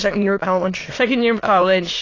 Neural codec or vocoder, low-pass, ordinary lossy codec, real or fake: autoencoder, 22.05 kHz, a latent of 192 numbers a frame, VITS, trained on many speakers; 7.2 kHz; AAC, 32 kbps; fake